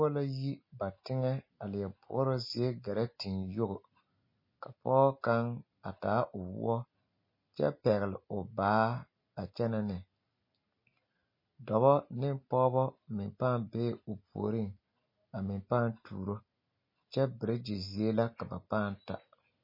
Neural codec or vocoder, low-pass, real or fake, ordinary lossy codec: none; 5.4 kHz; real; MP3, 24 kbps